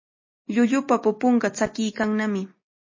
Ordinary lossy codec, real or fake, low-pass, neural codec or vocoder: MP3, 32 kbps; real; 7.2 kHz; none